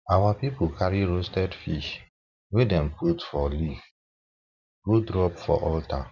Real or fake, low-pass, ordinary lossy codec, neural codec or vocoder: fake; 7.2 kHz; none; vocoder, 44.1 kHz, 128 mel bands every 512 samples, BigVGAN v2